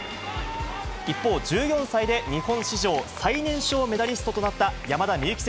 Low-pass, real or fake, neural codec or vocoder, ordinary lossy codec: none; real; none; none